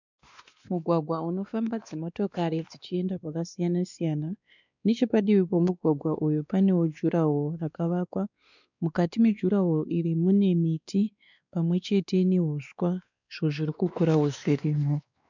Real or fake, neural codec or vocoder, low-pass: fake; codec, 16 kHz, 2 kbps, X-Codec, WavLM features, trained on Multilingual LibriSpeech; 7.2 kHz